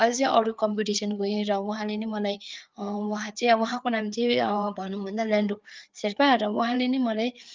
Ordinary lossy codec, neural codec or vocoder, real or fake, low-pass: Opus, 32 kbps; codec, 16 kHz, 4 kbps, FreqCodec, larger model; fake; 7.2 kHz